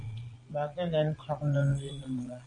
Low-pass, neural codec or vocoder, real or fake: 9.9 kHz; vocoder, 22.05 kHz, 80 mel bands, Vocos; fake